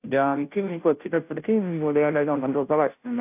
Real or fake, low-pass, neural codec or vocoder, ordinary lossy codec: fake; 3.6 kHz; codec, 16 kHz, 0.5 kbps, FunCodec, trained on Chinese and English, 25 frames a second; AAC, 32 kbps